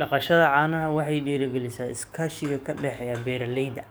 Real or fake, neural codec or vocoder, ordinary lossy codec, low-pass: fake; codec, 44.1 kHz, 7.8 kbps, DAC; none; none